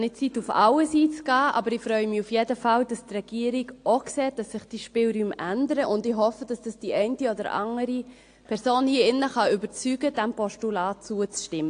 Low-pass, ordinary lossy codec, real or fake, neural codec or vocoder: 9.9 kHz; AAC, 48 kbps; real; none